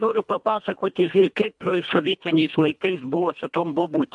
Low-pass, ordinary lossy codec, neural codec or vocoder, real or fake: 10.8 kHz; MP3, 64 kbps; codec, 24 kHz, 1.5 kbps, HILCodec; fake